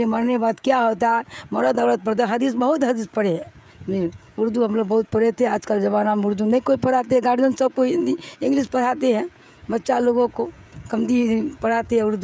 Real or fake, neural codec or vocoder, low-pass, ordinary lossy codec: fake; codec, 16 kHz, 16 kbps, FreqCodec, smaller model; none; none